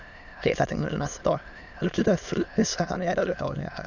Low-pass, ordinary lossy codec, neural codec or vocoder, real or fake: 7.2 kHz; none; autoencoder, 22.05 kHz, a latent of 192 numbers a frame, VITS, trained on many speakers; fake